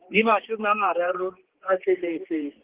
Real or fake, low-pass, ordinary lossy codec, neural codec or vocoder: fake; 3.6 kHz; Opus, 16 kbps; codec, 16 kHz, 4 kbps, X-Codec, HuBERT features, trained on general audio